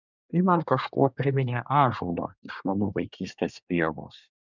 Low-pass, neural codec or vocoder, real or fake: 7.2 kHz; codec, 24 kHz, 1 kbps, SNAC; fake